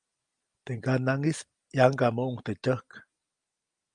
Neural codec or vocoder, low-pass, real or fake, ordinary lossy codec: none; 9.9 kHz; real; Opus, 32 kbps